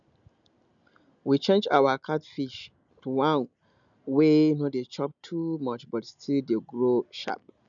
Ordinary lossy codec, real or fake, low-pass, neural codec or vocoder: MP3, 96 kbps; real; 7.2 kHz; none